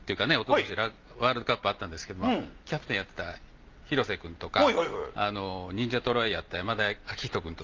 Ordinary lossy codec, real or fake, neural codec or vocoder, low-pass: Opus, 24 kbps; real; none; 7.2 kHz